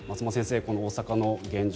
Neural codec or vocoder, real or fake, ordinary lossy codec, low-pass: none; real; none; none